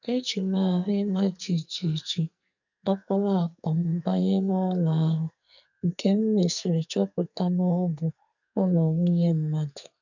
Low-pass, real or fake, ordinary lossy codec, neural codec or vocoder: 7.2 kHz; fake; none; codec, 44.1 kHz, 2.6 kbps, SNAC